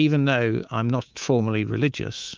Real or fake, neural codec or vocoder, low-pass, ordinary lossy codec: fake; codec, 24 kHz, 3.1 kbps, DualCodec; 7.2 kHz; Opus, 24 kbps